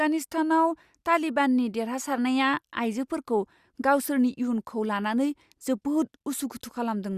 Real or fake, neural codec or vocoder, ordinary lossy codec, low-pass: real; none; Opus, 64 kbps; 14.4 kHz